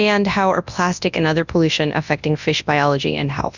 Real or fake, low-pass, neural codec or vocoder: fake; 7.2 kHz; codec, 24 kHz, 0.9 kbps, WavTokenizer, large speech release